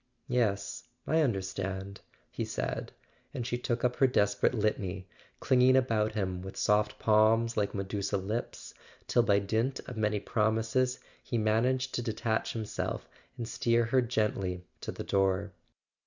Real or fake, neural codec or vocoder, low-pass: real; none; 7.2 kHz